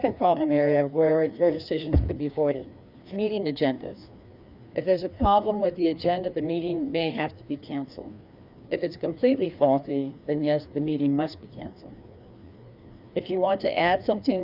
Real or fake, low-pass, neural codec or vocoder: fake; 5.4 kHz; codec, 16 kHz, 2 kbps, FreqCodec, larger model